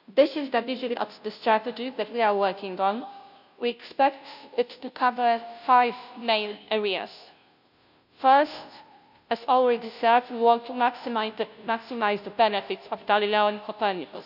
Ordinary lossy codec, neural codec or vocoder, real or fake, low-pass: none; codec, 16 kHz, 0.5 kbps, FunCodec, trained on Chinese and English, 25 frames a second; fake; 5.4 kHz